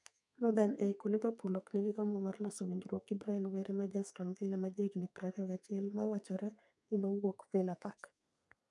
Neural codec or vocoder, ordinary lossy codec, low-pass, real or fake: codec, 32 kHz, 1.9 kbps, SNAC; none; 10.8 kHz; fake